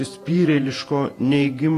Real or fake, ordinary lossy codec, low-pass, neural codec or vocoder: fake; AAC, 48 kbps; 14.4 kHz; vocoder, 48 kHz, 128 mel bands, Vocos